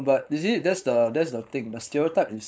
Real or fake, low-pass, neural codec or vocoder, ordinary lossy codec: fake; none; codec, 16 kHz, 4.8 kbps, FACodec; none